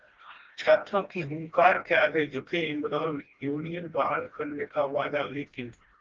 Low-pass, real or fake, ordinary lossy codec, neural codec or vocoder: 7.2 kHz; fake; Opus, 32 kbps; codec, 16 kHz, 1 kbps, FreqCodec, smaller model